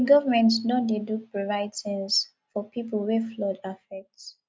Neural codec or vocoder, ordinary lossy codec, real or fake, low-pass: none; none; real; none